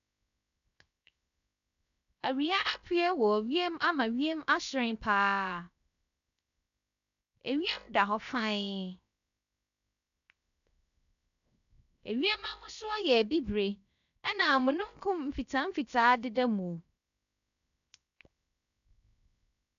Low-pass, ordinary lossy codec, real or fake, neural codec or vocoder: 7.2 kHz; none; fake; codec, 16 kHz, 0.7 kbps, FocalCodec